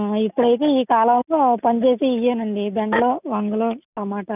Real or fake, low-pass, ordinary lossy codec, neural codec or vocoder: real; 3.6 kHz; none; none